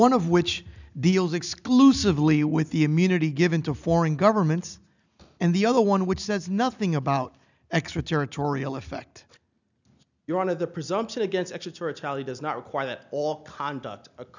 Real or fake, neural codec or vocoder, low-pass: real; none; 7.2 kHz